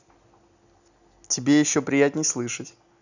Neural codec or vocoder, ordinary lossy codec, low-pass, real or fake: none; none; 7.2 kHz; real